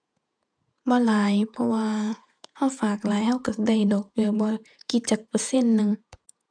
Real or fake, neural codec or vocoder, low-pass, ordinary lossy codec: fake; vocoder, 48 kHz, 128 mel bands, Vocos; 9.9 kHz; none